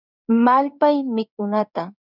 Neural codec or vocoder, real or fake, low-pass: codec, 16 kHz in and 24 kHz out, 1 kbps, XY-Tokenizer; fake; 5.4 kHz